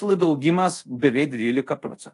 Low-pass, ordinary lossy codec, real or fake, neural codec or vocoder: 10.8 kHz; AAC, 48 kbps; fake; codec, 24 kHz, 0.5 kbps, DualCodec